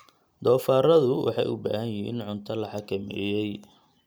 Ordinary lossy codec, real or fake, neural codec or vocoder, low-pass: none; real; none; none